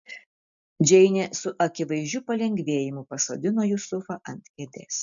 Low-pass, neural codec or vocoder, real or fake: 7.2 kHz; none; real